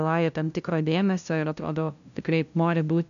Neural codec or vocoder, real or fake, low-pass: codec, 16 kHz, 0.5 kbps, FunCodec, trained on LibriTTS, 25 frames a second; fake; 7.2 kHz